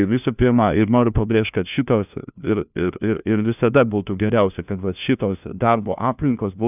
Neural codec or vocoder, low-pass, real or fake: codec, 16 kHz, 1 kbps, FunCodec, trained on LibriTTS, 50 frames a second; 3.6 kHz; fake